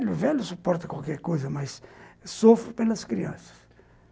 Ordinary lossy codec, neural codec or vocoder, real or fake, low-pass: none; none; real; none